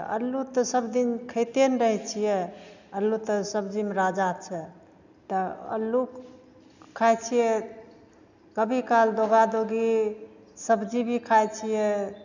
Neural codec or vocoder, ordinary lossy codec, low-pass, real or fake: none; none; 7.2 kHz; real